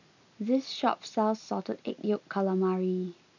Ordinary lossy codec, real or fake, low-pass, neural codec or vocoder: none; real; 7.2 kHz; none